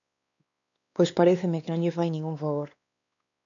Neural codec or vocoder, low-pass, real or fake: codec, 16 kHz, 2 kbps, X-Codec, WavLM features, trained on Multilingual LibriSpeech; 7.2 kHz; fake